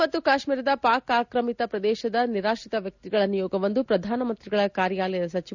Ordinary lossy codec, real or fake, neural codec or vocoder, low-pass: none; real; none; 7.2 kHz